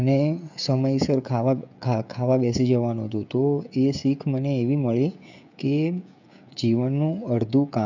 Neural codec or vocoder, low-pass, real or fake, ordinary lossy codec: codec, 16 kHz, 16 kbps, FreqCodec, smaller model; 7.2 kHz; fake; none